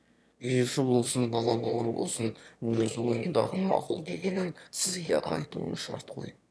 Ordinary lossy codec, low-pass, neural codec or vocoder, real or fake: none; none; autoencoder, 22.05 kHz, a latent of 192 numbers a frame, VITS, trained on one speaker; fake